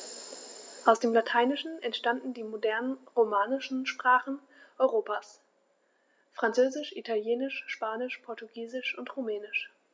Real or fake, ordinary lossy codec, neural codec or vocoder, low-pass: real; none; none; none